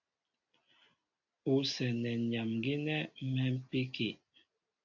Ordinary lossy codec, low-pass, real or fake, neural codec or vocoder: AAC, 48 kbps; 7.2 kHz; real; none